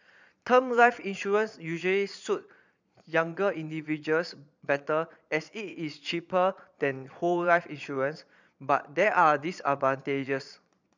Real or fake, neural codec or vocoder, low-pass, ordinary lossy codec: fake; vocoder, 22.05 kHz, 80 mel bands, Vocos; 7.2 kHz; none